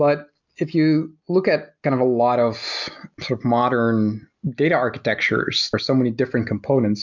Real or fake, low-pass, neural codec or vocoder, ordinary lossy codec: real; 7.2 kHz; none; MP3, 64 kbps